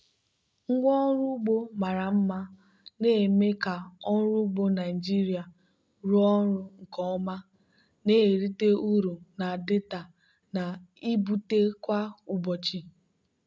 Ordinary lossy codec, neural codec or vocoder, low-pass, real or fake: none; none; none; real